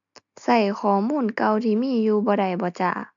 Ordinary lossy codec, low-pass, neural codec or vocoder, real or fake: MP3, 64 kbps; 7.2 kHz; none; real